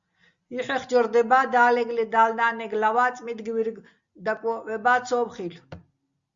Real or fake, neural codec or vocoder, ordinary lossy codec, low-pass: real; none; Opus, 64 kbps; 7.2 kHz